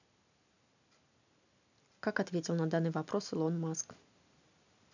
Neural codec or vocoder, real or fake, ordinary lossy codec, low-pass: none; real; none; 7.2 kHz